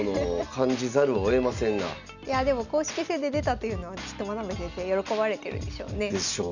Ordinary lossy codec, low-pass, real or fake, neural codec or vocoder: none; 7.2 kHz; real; none